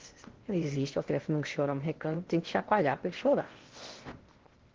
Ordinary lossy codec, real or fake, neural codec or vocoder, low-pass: Opus, 16 kbps; fake; codec, 16 kHz in and 24 kHz out, 0.8 kbps, FocalCodec, streaming, 65536 codes; 7.2 kHz